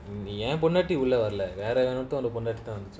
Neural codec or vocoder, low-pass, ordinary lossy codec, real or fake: none; none; none; real